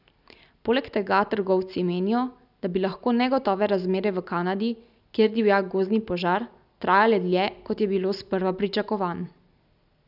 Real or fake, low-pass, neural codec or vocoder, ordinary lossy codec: real; 5.4 kHz; none; none